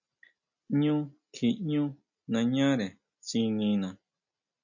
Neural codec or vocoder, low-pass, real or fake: none; 7.2 kHz; real